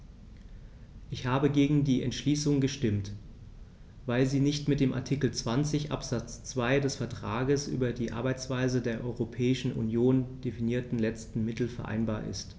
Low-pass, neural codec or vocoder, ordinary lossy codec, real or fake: none; none; none; real